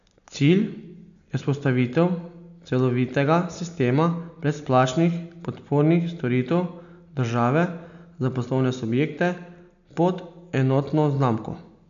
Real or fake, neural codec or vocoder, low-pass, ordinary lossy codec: real; none; 7.2 kHz; none